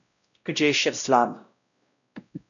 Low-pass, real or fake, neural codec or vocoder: 7.2 kHz; fake; codec, 16 kHz, 0.5 kbps, X-Codec, WavLM features, trained on Multilingual LibriSpeech